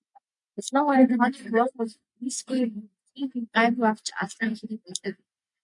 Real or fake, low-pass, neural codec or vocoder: real; 10.8 kHz; none